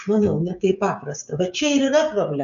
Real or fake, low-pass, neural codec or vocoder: fake; 7.2 kHz; codec, 16 kHz, 16 kbps, FreqCodec, smaller model